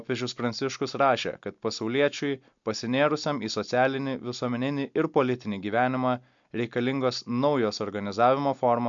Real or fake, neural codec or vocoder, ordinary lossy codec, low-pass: real; none; MP3, 64 kbps; 7.2 kHz